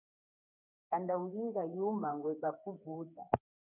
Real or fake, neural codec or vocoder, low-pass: fake; codec, 16 kHz, 4 kbps, FreqCodec, smaller model; 3.6 kHz